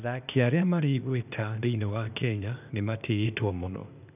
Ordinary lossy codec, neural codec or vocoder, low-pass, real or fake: none; codec, 16 kHz, 0.8 kbps, ZipCodec; 3.6 kHz; fake